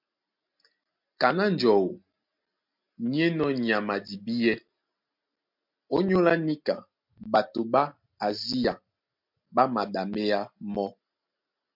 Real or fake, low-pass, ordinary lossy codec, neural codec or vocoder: real; 5.4 kHz; MP3, 48 kbps; none